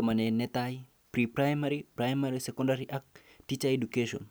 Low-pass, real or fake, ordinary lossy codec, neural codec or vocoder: none; real; none; none